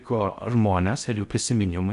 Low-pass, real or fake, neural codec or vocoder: 10.8 kHz; fake; codec, 16 kHz in and 24 kHz out, 0.6 kbps, FocalCodec, streaming, 4096 codes